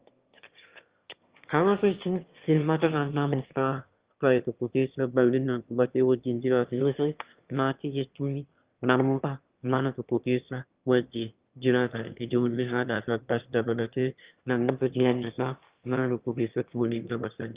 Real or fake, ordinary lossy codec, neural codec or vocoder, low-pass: fake; Opus, 32 kbps; autoencoder, 22.05 kHz, a latent of 192 numbers a frame, VITS, trained on one speaker; 3.6 kHz